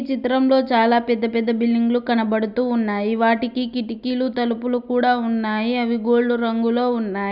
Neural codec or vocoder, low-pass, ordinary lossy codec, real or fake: none; 5.4 kHz; none; real